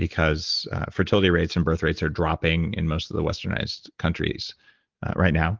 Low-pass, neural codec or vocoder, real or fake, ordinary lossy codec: 7.2 kHz; none; real; Opus, 16 kbps